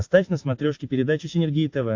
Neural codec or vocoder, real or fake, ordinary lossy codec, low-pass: none; real; AAC, 48 kbps; 7.2 kHz